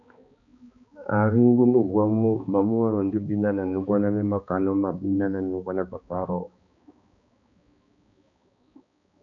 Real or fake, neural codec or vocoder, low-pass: fake; codec, 16 kHz, 2 kbps, X-Codec, HuBERT features, trained on balanced general audio; 7.2 kHz